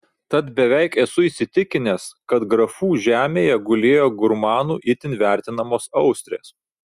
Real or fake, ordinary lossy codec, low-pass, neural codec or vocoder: real; Opus, 64 kbps; 14.4 kHz; none